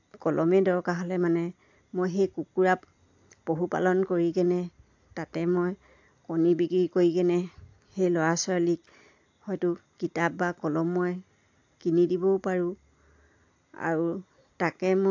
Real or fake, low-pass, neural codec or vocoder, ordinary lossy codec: real; 7.2 kHz; none; AAC, 48 kbps